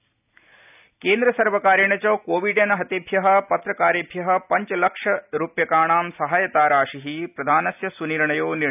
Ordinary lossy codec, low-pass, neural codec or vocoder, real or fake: none; 3.6 kHz; none; real